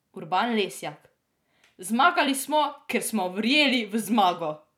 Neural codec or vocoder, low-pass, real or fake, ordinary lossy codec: vocoder, 44.1 kHz, 128 mel bands every 256 samples, BigVGAN v2; 19.8 kHz; fake; none